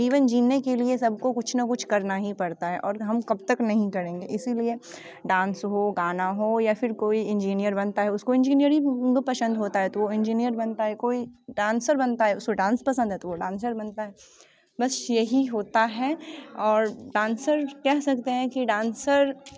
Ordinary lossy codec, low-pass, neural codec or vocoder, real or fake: none; none; none; real